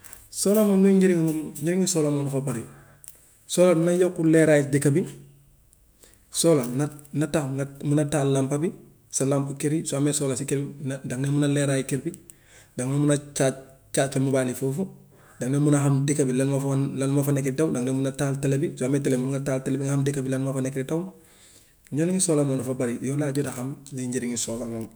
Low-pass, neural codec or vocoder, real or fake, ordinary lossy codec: none; autoencoder, 48 kHz, 128 numbers a frame, DAC-VAE, trained on Japanese speech; fake; none